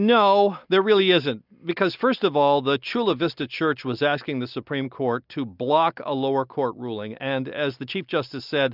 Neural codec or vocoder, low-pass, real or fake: none; 5.4 kHz; real